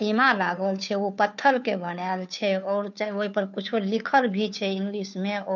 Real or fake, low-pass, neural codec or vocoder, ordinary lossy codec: fake; 7.2 kHz; codec, 16 kHz, 2 kbps, FunCodec, trained on Chinese and English, 25 frames a second; none